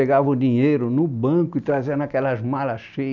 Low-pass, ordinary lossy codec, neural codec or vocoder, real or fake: 7.2 kHz; none; none; real